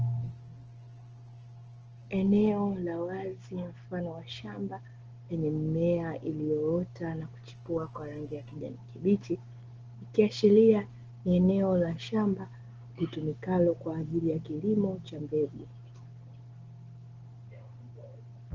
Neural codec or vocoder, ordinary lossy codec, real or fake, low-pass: none; Opus, 16 kbps; real; 7.2 kHz